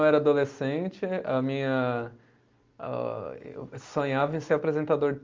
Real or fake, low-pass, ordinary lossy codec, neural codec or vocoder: real; 7.2 kHz; Opus, 32 kbps; none